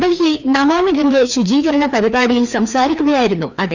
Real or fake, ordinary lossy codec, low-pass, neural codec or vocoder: fake; none; 7.2 kHz; codec, 16 kHz, 2 kbps, FreqCodec, larger model